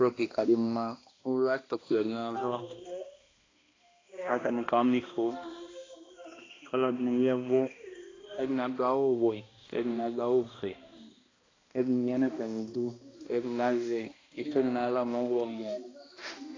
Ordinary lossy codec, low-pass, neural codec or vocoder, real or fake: AAC, 32 kbps; 7.2 kHz; codec, 16 kHz, 1 kbps, X-Codec, HuBERT features, trained on balanced general audio; fake